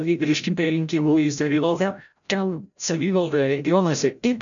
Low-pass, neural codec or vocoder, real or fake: 7.2 kHz; codec, 16 kHz, 0.5 kbps, FreqCodec, larger model; fake